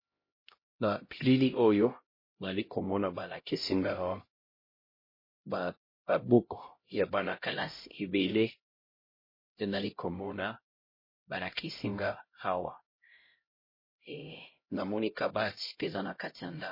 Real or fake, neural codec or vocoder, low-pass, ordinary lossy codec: fake; codec, 16 kHz, 0.5 kbps, X-Codec, HuBERT features, trained on LibriSpeech; 5.4 kHz; MP3, 24 kbps